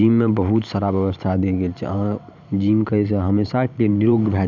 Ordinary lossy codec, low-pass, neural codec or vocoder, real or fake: none; 7.2 kHz; vocoder, 44.1 kHz, 128 mel bands every 512 samples, BigVGAN v2; fake